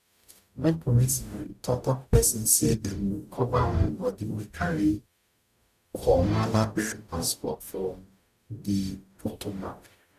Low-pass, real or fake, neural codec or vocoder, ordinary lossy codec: 14.4 kHz; fake; codec, 44.1 kHz, 0.9 kbps, DAC; none